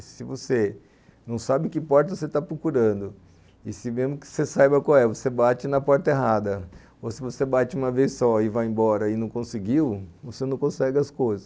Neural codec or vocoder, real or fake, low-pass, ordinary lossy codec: none; real; none; none